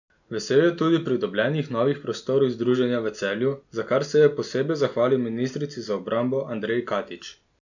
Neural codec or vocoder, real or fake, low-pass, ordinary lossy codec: none; real; 7.2 kHz; none